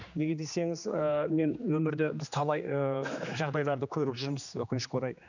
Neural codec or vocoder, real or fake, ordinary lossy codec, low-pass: codec, 16 kHz, 2 kbps, X-Codec, HuBERT features, trained on general audio; fake; none; 7.2 kHz